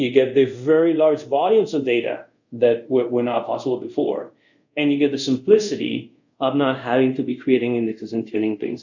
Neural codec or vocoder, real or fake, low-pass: codec, 24 kHz, 0.5 kbps, DualCodec; fake; 7.2 kHz